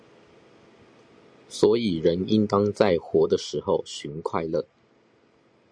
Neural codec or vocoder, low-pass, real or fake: none; 9.9 kHz; real